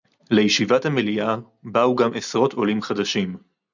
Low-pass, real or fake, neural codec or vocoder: 7.2 kHz; real; none